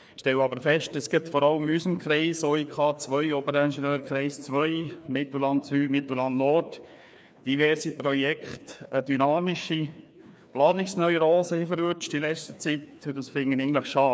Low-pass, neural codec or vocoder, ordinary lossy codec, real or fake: none; codec, 16 kHz, 2 kbps, FreqCodec, larger model; none; fake